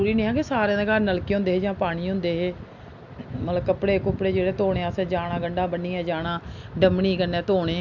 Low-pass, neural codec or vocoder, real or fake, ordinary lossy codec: 7.2 kHz; none; real; MP3, 64 kbps